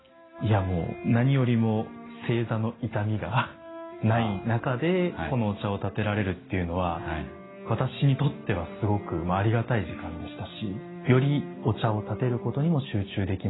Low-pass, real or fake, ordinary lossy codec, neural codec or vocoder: 7.2 kHz; real; AAC, 16 kbps; none